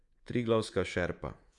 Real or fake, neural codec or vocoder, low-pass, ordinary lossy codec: fake; codec, 24 kHz, 3.1 kbps, DualCodec; 10.8 kHz; Opus, 64 kbps